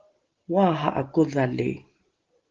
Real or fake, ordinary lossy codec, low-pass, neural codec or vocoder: real; Opus, 16 kbps; 7.2 kHz; none